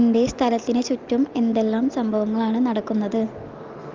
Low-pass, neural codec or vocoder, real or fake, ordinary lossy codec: 7.2 kHz; none; real; Opus, 32 kbps